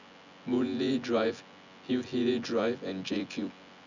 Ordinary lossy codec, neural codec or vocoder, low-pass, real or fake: none; vocoder, 24 kHz, 100 mel bands, Vocos; 7.2 kHz; fake